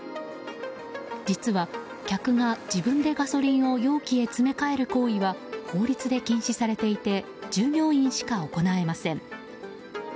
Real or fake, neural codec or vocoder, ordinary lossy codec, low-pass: real; none; none; none